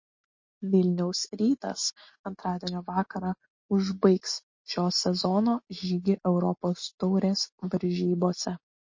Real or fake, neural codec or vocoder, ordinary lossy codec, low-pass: real; none; MP3, 32 kbps; 7.2 kHz